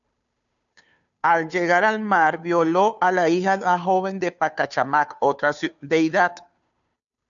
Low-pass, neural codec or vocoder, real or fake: 7.2 kHz; codec, 16 kHz, 2 kbps, FunCodec, trained on Chinese and English, 25 frames a second; fake